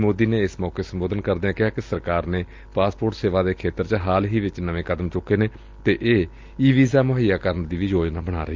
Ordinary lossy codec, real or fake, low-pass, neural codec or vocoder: Opus, 32 kbps; real; 7.2 kHz; none